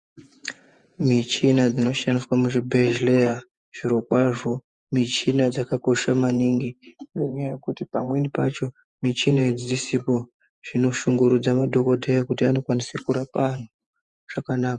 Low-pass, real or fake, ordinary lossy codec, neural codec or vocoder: 10.8 kHz; fake; Opus, 64 kbps; vocoder, 48 kHz, 128 mel bands, Vocos